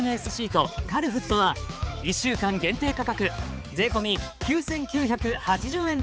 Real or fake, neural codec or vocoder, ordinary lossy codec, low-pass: fake; codec, 16 kHz, 4 kbps, X-Codec, HuBERT features, trained on balanced general audio; none; none